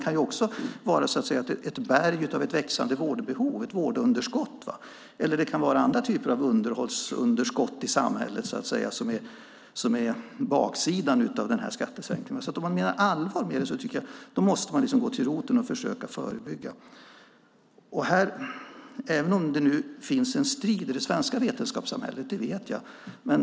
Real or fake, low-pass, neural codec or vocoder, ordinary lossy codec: real; none; none; none